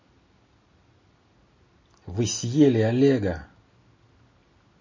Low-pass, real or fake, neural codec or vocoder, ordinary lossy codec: 7.2 kHz; real; none; MP3, 32 kbps